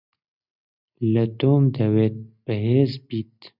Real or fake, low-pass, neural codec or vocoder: real; 5.4 kHz; none